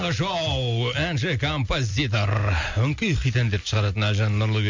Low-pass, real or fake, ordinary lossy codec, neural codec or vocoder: 7.2 kHz; real; MP3, 64 kbps; none